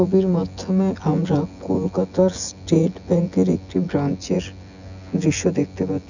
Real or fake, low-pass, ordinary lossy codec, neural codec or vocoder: fake; 7.2 kHz; none; vocoder, 24 kHz, 100 mel bands, Vocos